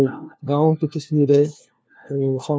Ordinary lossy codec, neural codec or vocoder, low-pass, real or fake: none; codec, 16 kHz, 1 kbps, FunCodec, trained on LibriTTS, 50 frames a second; none; fake